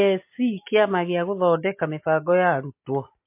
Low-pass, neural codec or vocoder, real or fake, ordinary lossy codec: 3.6 kHz; none; real; MP3, 24 kbps